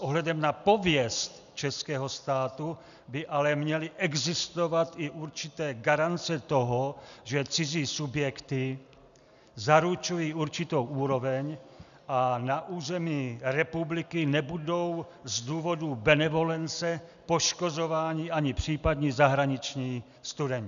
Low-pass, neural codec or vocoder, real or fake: 7.2 kHz; none; real